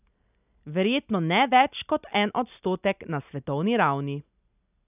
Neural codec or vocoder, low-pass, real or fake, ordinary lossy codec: none; 3.6 kHz; real; none